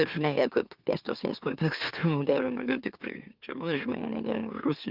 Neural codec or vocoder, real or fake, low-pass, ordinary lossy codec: autoencoder, 44.1 kHz, a latent of 192 numbers a frame, MeloTTS; fake; 5.4 kHz; Opus, 32 kbps